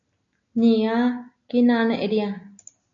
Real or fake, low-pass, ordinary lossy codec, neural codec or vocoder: real; 7.2 kHz; MP3, 64 kbps; none